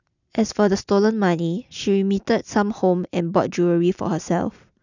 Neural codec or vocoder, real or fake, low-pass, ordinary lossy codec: none; real; 7.2 kHz; none